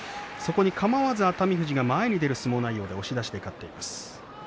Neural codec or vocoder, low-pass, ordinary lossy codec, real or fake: none; none; none; real